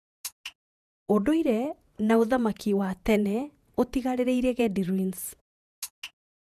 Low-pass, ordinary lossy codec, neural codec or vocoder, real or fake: 14.4 kHz; none; vocoder, 48 kHz, 128 mel bands, Vocos; fake